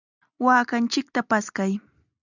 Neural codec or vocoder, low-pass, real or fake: none; 7.2 kHz; real